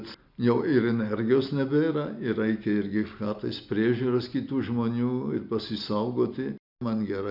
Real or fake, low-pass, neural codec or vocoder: real; 5.4 kHz; none